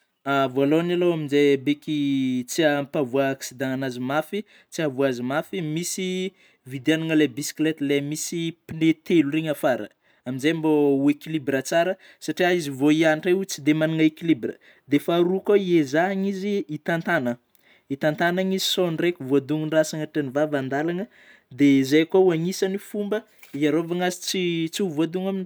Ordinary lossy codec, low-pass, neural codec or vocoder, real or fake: none; none; none; real